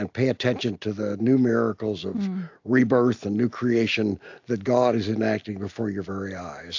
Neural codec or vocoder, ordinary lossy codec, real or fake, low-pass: none; AAC, 48 kbps; real; 7.2 kHz